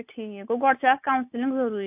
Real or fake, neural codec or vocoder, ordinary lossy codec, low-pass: real; none; AAC, 32 kbps; 3.6 kHz